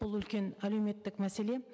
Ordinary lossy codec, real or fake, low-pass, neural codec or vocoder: none; real; none; none